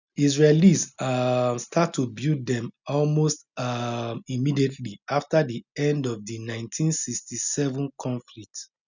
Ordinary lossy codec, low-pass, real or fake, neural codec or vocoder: none; 7.2 kHz; real; none